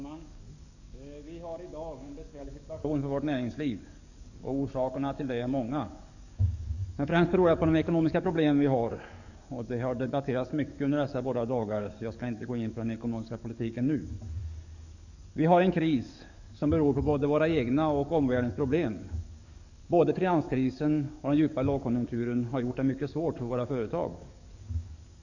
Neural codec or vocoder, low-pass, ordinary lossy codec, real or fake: codec, 44.1 kHz, 7.8 kbps, DAC; 7.2 kHz; none; fake